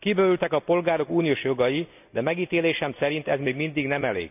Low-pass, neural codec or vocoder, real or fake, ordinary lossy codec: 3.6 kHz; none; real; none